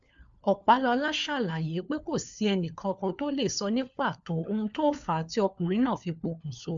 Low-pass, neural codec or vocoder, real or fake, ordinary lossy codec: 7.2 kHz; codec, 16 kHz, 4 kbps, FunCodec, trained on LibriTTS, 50 frames a second; fake; MP3, 64 kbps